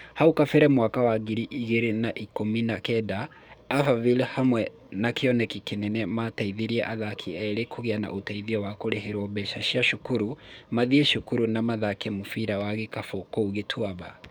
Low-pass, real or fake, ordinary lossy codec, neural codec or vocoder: 19.8 kHz; fake; none; codec, 44.1 kHz, 7.8 kbps, DAC